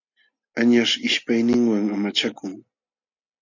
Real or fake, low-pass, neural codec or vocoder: real; 7.2 kHz; none